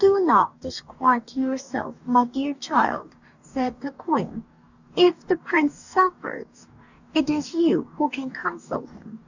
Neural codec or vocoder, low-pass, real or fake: codec, 44.1 kHz, 2.6 kbps, DAC; 7.2 kHz; fake